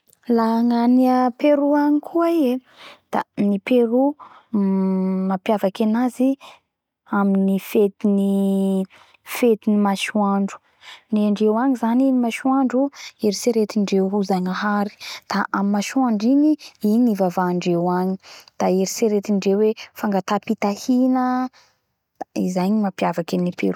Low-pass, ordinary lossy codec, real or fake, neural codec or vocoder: 19.8 kHz; none; real; none